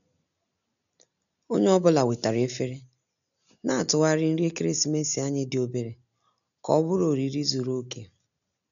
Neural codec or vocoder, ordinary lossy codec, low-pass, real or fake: none; none; 7.2 kHz; real